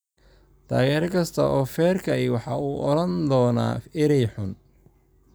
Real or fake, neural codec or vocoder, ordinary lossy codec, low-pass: real; none; none; none